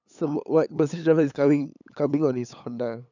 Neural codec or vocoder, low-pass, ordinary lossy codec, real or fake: codec, 16 kHz, 8 kbps, FunCodec, trained on LibriTTS, 25 frames a second; 7.2 kHz; none; fake